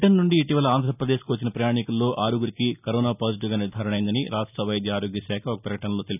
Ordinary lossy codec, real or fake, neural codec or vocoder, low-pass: none; real; none; 3.6 kHz